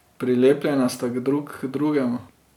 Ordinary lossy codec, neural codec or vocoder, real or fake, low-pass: none; none; real; 19.8 kHz